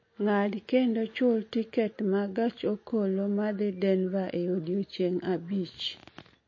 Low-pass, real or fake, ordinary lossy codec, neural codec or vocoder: 7.2 kHz; real; MP3, 32 kbps; none